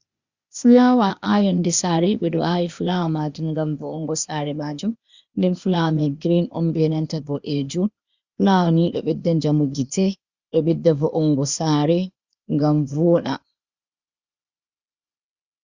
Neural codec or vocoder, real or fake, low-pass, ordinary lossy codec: codec, 16 kHz, 0.8 kbps, ZipCodec; fake; 7.2 kHz; Opus, 64 kbps